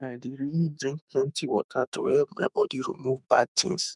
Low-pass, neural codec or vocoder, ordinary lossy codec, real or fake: 10.8 kHz; autoencoder, 48 kHz, 32 numbers a frame, DAC-VAE, trained on Japanese speech; none; fake